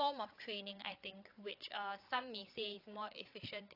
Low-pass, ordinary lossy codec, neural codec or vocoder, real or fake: 5.4 kHz; AAC, 32 kbps; codec, 16 kHz, 8 kbps, FreqCodec, larger model; fake